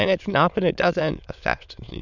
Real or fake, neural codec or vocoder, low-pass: fake; autoencoder, 22.05 kHz, a latent of 192 numbers a frame, VITS, trained on many speakers; 7.2 kHz